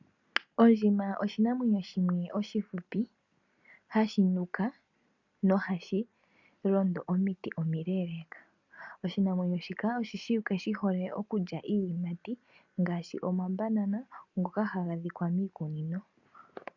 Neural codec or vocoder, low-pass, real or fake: none; 7.2 kHz; real